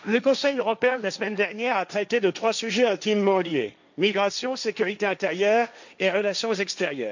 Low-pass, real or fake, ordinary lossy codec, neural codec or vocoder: 7.2 kHz; fake; none; codec, 16 kHz, 1.1 kbps, Voila-Tokenizer